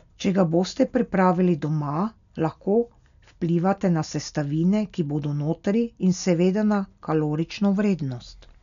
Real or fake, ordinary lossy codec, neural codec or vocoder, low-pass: real; none; none; 7.2 kHz